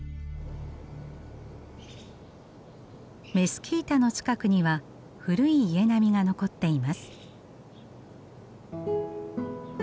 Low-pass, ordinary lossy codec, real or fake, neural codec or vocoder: none; none; real; none